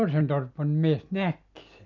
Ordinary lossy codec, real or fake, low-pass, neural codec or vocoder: none; real; 7.2 kHz; none